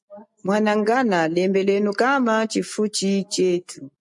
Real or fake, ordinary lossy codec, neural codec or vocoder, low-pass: real; MP3, 64 kbps; none; 10.8 kHz